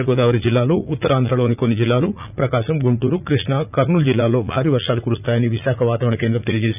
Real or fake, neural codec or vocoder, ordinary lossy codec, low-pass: fake; vocoder, 44.1 kHz, 80 mel bands, Vocos; none; 3.6 kHz